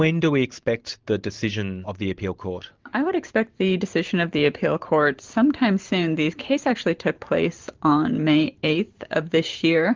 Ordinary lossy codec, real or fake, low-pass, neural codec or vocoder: Opus, 32 kbps; fake; 7.2 kHz; vocoder, 44.1 kHz, 128 mel bands every 512 samples, BigVGAN v2